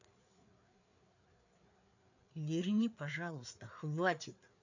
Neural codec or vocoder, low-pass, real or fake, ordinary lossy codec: codec, 16 kHz, 4 kbps, FreqCodec, larger model; 7.2 kHz; fake; none